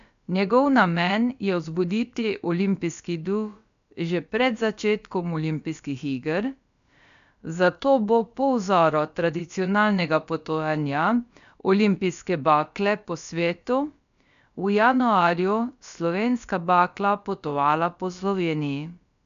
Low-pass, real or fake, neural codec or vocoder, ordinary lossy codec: 7.2 kHz; fake; codec, 16 kHz, about 1 kbps, DyCAST, with the encoder's durations; none